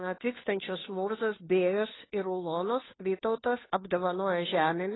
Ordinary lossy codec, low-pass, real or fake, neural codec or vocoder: AAC, 16 kbps; 7.2 kHz; fake; codec, 16 kHz, 2 kbps, FunCodec, trained on Chinese and English, 25 frames a second